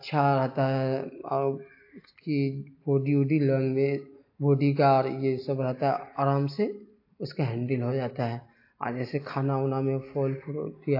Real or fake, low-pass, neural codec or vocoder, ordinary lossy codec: real; 5.4 kHz; none; AAC, 32 kbps